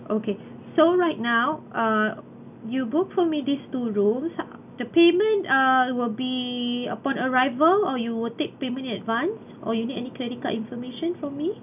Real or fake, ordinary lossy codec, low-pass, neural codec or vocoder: real; none; 3.6 kHz; none